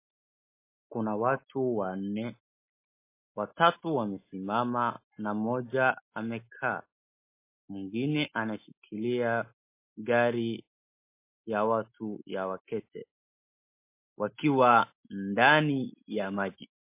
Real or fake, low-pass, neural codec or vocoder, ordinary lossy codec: real; 3.6 kHz; none; MP3, 24 kbps